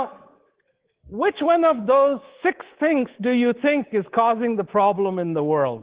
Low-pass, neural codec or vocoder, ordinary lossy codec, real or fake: 3.6 kHz; codec, 24 kHz, 3.1 kbps, DualCodec; Opus, 16 kbps; fake